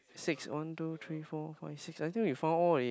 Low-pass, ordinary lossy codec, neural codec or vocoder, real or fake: none; none; none; real